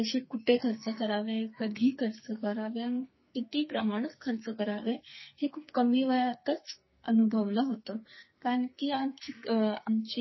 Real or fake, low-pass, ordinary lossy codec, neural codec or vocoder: fake; 7.2 kHz; MP3, 24 kbps; codec, 44.1 kHz, 2.6 kbps, SNAC